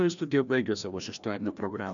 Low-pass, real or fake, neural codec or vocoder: 7.2 kHz; fake; codec, 16 kHz, 1 kbps, FreqCodec, larger model